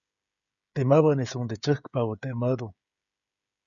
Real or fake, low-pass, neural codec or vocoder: fake; 7.2 kHz; codec, 16 kHz, 16 kbps, FreqCodec, smaller model